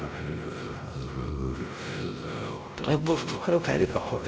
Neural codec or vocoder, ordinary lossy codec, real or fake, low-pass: codec, 16 kHz, 0.5 kbps, X-Codec, WavLM features, trained on Multilingual LibriSpeech; none; fake; none